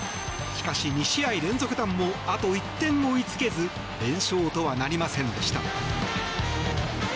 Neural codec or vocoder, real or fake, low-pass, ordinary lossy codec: none; real; none; none